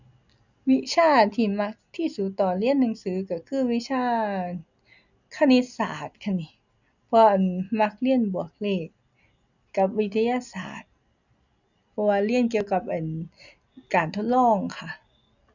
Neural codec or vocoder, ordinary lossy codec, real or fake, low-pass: none; none; real; 7.2 kHz